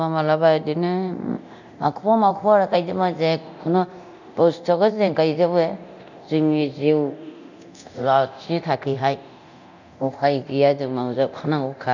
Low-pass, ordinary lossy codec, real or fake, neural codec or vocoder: 7.2 kHz; none; fake; codec, 24 kHz, 0.9 kbps, DualCodec